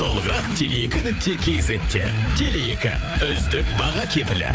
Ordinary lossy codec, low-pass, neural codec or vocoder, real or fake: none; none; codec, 16 kHz, 4 kbps, FreqCodec, larger model; fake